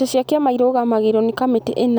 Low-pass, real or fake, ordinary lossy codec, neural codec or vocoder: none; real; none; none